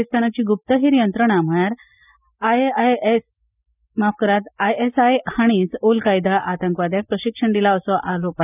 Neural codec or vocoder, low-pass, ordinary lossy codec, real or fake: none; 3.6 kHz; none; real